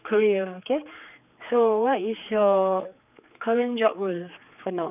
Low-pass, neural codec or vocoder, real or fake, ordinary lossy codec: 3.6 kHz; codec, 16 kHz, 4 kbps, X-Codec, HuBERT features, trained on general audio; fake; none